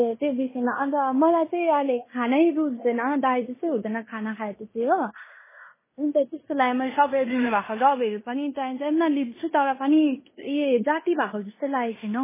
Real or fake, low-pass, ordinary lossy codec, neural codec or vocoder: fake; 3.6 kHz; MP3, 16 kbps; codec, 16 kHz in and 24 kHz out, 0.9 kbps, LongCat-Audio-Codec, fine tuned four codebook decoder